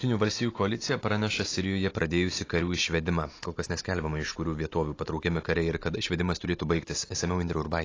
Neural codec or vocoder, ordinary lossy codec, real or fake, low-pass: none; AAC, 32 kbps; real; 7.2 kHz